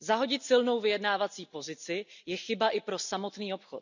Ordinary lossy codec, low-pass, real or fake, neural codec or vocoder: none; 7.2 kHz; real; none